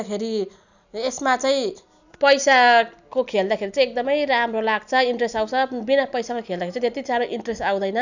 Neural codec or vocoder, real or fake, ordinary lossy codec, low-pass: none; real; none; 7.2 kHz